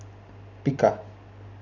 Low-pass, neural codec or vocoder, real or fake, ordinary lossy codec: 7.2 kHz; none; real; none